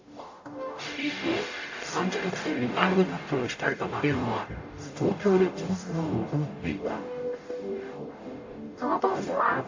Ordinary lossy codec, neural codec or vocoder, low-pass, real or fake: AAC, 48 kbps; codec, 44.1 kHz, 0.9 kbps, DAC; 7.2 kHz; fake